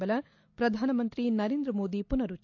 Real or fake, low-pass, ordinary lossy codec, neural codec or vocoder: real; 7.2 kHz; AAC, 48 kbps; none